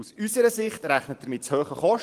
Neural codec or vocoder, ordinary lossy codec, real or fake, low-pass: vocoder, 44.1 kHz, 128 mel bands every 512 samples, BigVGAN v2; Opus, 24 kbps; fake; 14.4 kHz